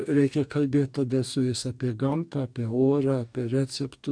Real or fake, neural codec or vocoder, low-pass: fake; codec, 44.1 kHz, 2.6 kbps, DAC; 9.9 kHz